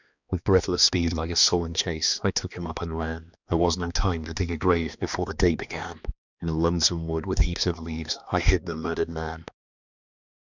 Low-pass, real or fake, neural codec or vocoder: 7.2 kHz; fake; codec, 16 kHz, 2 kbps, X-Codec, HuBERT features, trained on balanced general audio